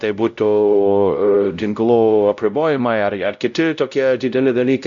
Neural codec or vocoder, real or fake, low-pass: codec, 16 kHz, 0.5 kbps, X-Codec, WavLM features, trained on Multilingual LibriSpeech; fake; 7.2 kHz